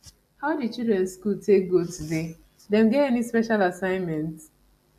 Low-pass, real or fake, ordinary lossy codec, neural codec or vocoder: 14.4 kHz; real; AAC, 96 kbps; none